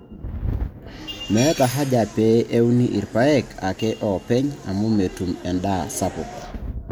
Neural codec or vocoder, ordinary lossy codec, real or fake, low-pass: none; none; real; none